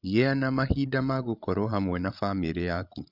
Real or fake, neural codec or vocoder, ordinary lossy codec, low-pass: fake; codec, 16 kHz, 16 kbps, FreqCodec, larger model; none; 5.4 kHz